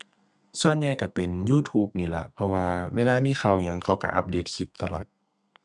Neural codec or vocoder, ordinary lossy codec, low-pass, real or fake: codec, 32 kHz, 1.9 kbps, SNAC; none; 10.8 kHz; fake